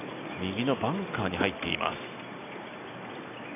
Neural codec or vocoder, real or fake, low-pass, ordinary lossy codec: none; real; 3.6 kHz; none